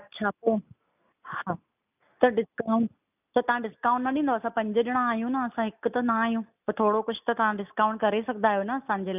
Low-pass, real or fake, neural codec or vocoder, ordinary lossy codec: 3.6 kHz; real; none; none